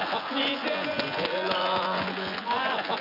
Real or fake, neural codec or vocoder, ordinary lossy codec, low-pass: fake; vocoder, 24 kHz, 100 mel bands, Vocos; none; 5.4 kHz